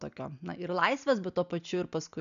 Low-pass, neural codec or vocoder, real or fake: 7.2 kHz; none; real